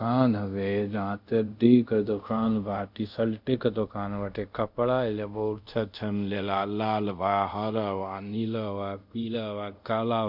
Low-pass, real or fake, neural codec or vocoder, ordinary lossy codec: 5.4 kHz; fake; codec, 24 kHz, 0.5 kbps, DualCodec; none